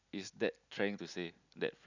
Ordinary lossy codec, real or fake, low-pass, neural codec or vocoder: none; real; 7.2 kHz; none